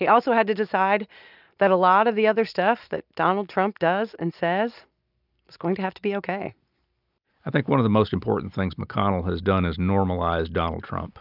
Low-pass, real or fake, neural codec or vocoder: 5.4 kHz; real; none